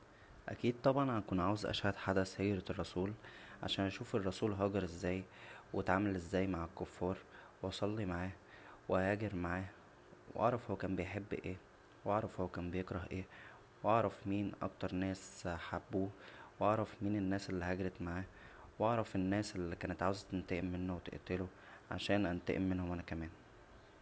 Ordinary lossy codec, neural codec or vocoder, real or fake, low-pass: none; none; real; none